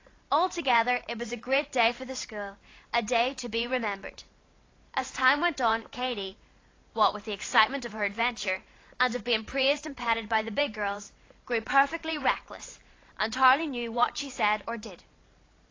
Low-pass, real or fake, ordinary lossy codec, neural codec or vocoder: 7.2 kHz; fake; AAC, 32 kbps; vocoder, 44.1 kHz, 128 mel bands every 512 samples, BigVGAN v2